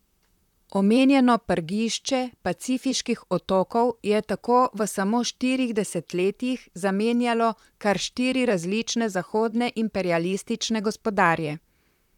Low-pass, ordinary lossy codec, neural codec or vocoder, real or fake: 19.8 kHz; none; vocoder, 44.1 kHz, 128 mel bands, Pupu-Vocoder; fake